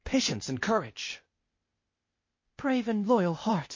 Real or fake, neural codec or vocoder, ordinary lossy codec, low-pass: fake; codec, 16 kHz, 0.8 kbps, ZipCodec; MP3, 32 kbps; 7.2 kHz